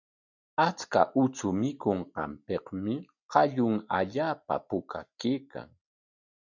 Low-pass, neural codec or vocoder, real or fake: 7.2 kHz; none; real